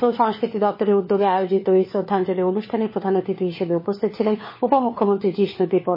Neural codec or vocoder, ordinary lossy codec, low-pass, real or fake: codec, 16 kHz, 4 kbps, FunCodec, trained on LibriTTS, 50 frames a second; MP3, 24 kbps; 5.4 kHz; fake